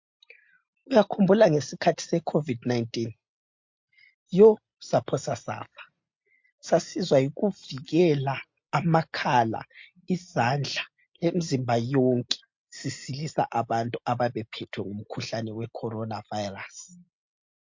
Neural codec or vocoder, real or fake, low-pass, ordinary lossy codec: none; real; 7.2 kHz; MP3, 48 kbps